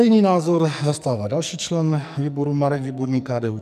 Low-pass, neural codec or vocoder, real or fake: 14.4 kHz; codec, 44.1 kHz, 2.6 kbps, SNAC; fake